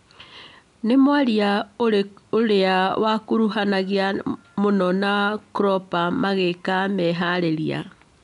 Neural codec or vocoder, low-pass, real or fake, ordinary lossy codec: none; 10.8 kHz; real; none